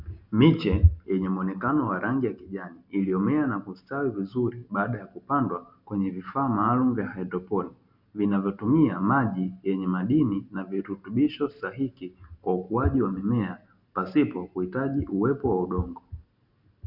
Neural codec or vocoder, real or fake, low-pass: none; real; 5.4 kHz